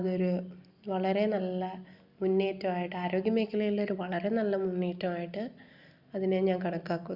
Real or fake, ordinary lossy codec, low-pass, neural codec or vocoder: real; Opus, 64 kbps; 5.4 kHz; none